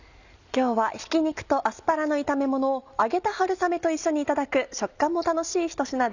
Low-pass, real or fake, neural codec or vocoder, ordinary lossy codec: 7.2 kHz; real; none; none